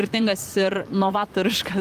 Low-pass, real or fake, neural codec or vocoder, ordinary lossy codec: 14.4 kHz; fake; vocoder, 44.1 kHz, 128 mel bands, Pupu-Vocoder; Opus, 32 kbps